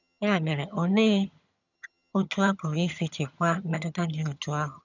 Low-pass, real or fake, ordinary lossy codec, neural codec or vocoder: 7.2 kHz; fake; none; vocoder, 22.05 kHz, 80 mel bands, HiFi-GAN